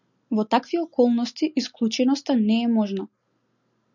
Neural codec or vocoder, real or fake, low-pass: none; real; 7.2 kHz